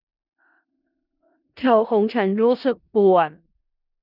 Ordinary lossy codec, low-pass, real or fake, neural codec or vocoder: none; 5.4 kHz; fake; codec, 16 kHz in and 24 kHz out, 0.4 kbps, LongCat-Audio-Codec, four codebook decoder